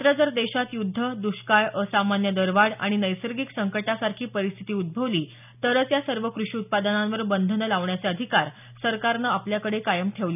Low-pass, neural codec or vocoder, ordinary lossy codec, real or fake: 3.6 kHz; none; none; real